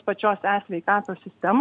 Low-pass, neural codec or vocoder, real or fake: 9.9 kHz; none; real